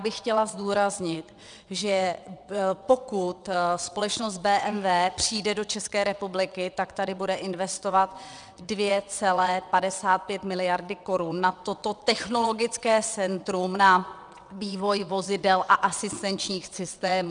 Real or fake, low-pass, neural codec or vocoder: fake; 9.9 kHz; vocoder, 22.05 kHz, 80 mel bands, WaveNeXt